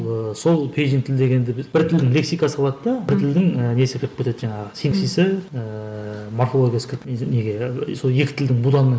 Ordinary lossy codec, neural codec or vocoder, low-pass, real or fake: none; none; none; real